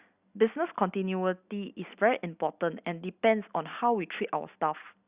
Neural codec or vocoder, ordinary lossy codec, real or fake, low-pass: none; Opus, 64 kbps; real; 3.6 kHz